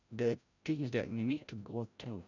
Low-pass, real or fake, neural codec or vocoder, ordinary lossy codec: 7.2 kHz; fake; codec, 16 kHz, 0.5 kbps, FreqCodec, larger model; none